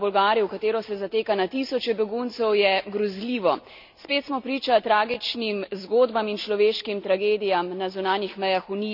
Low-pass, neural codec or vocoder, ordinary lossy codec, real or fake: 5.4 kHz; none; none; real